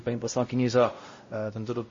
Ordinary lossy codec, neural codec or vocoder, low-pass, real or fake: MP3, 32 kbps; codec, 16 kHz, 0.5 kbps, X-Codec, WavLM features, trained on Multilingual LibriSpeech; 7.2 kHz; fake